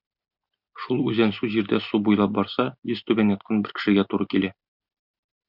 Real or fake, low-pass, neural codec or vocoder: real; 5.4 kHz; none